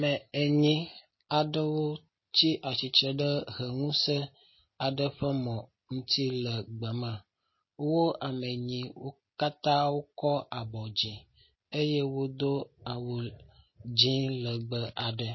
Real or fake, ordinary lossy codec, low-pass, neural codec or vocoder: real; MP3, 24 kbps; 7.2 kHz; none